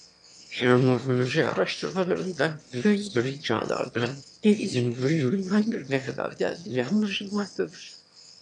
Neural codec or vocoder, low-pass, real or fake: autoencoder, 22.05 kHz, a latent of 192 numbers a frame, VITS, trained on one speaker; 9.9 kHz; fake